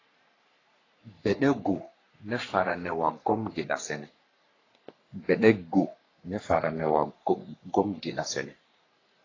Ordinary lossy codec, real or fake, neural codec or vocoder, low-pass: AAC, 32 kbps; fake; codec, 44.1 kHz, 3.4 kbps, Pupu-Codec; 7.2 kHz